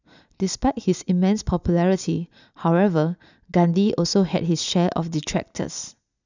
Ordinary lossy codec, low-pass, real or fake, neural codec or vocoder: none; 7.2 kHz; real; none